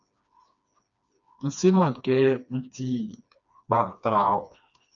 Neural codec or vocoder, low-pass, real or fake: codec, 16 kHz, 2 kbps, FreqCodec, smaller model; 7.2 kHz; fake